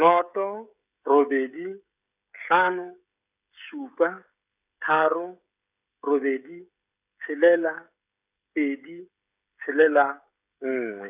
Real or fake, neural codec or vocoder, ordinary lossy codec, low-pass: fake; codec, 16 kHz, 16 kbps, FreqCodec, smaller model; none; 3.6 kHz